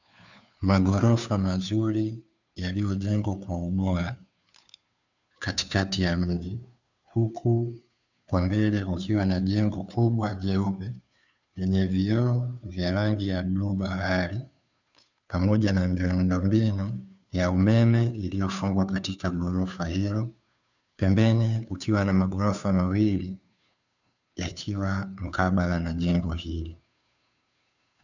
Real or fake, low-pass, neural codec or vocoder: fake; 7.2 kHz; codec, 16 kHz, 2 kbps, FunCodec, trained on Chinese and English, 25 frames a second